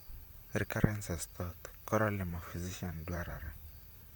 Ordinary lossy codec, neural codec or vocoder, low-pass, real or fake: none; vocoder, 44.1 kHz, 128 mel bands, Pupu-Vocoder; none; fake